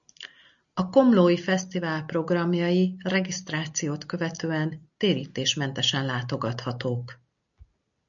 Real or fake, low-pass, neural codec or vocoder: real; 7.2 kHz; none